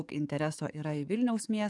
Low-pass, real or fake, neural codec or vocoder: 10.8 kHz; fake; codec, 44.1 kHz, 7.8 kbps, DAC